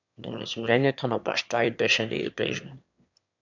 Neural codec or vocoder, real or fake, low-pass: autoencoder, 22.05 kHz, a latent of 192 numbers a frame, VITS, trained on one speaker; fake; 7.2 kHz